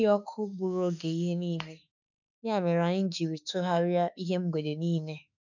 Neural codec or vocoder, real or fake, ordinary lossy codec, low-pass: autoencoder, 48 kHz, 32 numbers a frame, DAC-VAE, trained on Japanese speech; fake; none; 7.2 kHz